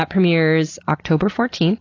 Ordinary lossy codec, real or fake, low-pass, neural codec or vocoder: AAC, 32 kbps; real; 7.2 kHz; none